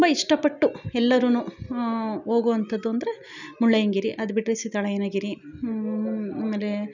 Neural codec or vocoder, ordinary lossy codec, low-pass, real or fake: none; none; 7.2 kHz; real